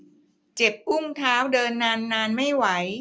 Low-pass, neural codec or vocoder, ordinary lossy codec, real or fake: none; none; none; real